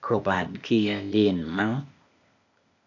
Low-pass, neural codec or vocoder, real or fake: 7.2 kHz; codec, 16 kHz, 0.8 kbps, ZipCodec; fake